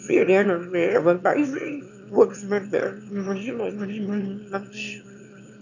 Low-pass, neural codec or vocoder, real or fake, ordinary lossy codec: 7.2 kHz; autoencoder, 22.05 kHz, a latent of 192 numbers a frame, VITS, trained on one speaker; fake; none